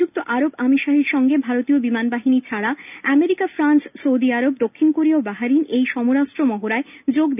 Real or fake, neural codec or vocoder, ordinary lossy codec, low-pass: real; none; none; 3.6 kHz